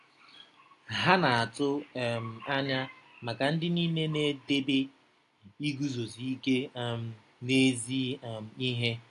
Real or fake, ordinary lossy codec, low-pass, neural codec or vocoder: real; AAC, 48 kbps; 10.8 kHz; none